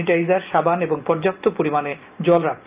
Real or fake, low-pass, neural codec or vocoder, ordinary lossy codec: real; 3.6 kHz; none; Opus, 24 kbps